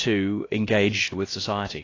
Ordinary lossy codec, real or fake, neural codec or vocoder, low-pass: AAC, 32 kbps; fake; codec, 16 kHz, about 1 kbps, DyCAST, with the encoder's durations; 7.2 kHz